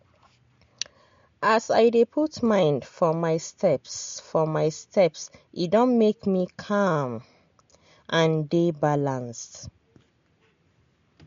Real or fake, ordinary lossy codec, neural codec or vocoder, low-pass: real; MP3, 48 kbps; none; 7.2 kHz